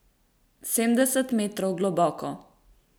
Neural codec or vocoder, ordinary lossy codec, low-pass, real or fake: none; none; none; real